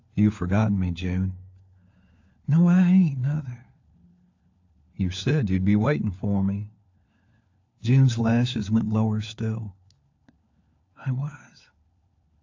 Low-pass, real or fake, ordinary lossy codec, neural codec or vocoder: 7.2 kHz; fake; AAC, 48 kbps; codec, 16 kHz, 4 kbps, FunCodec, trained on LibriTTS, 50 frames a second